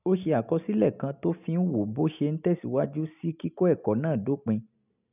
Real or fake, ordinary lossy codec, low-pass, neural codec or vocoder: real; none; 3.6 kHz; none